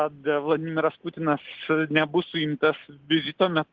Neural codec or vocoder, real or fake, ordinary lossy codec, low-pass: none; real; Opus, 16 kbps; 7.2 kHz